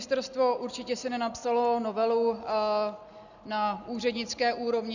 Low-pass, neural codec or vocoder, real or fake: 7.2 kHz; none; real